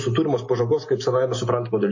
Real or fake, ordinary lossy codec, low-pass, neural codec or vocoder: real; MP3, 32 kbps; 7.2 kHz; none